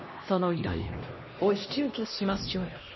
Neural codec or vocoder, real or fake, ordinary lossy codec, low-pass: codec, 16 kHz, 1 kbps, X-Codec, HuBERT features, trained on LibriSpeech; fake; MP3, 24 kbps; 7.2 kHz